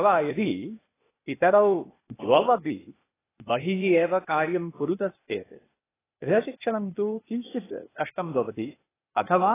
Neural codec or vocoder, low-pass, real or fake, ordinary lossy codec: codec, 16 kHz, 0.8 kbps, ZipCodec; 3.6 kHz; fake; AAC, 16 kbps